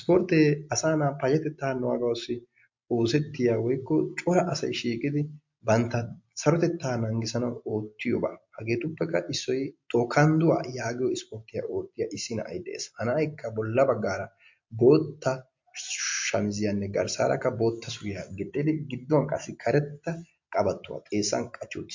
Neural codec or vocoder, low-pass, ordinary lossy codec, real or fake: none; 7.2 kHz; MP3, 48 kbps; real